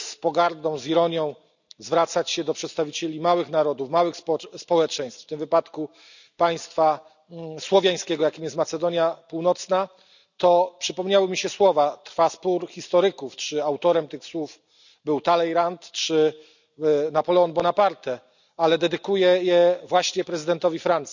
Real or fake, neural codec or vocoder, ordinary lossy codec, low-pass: real; none; none; 7.2 kHz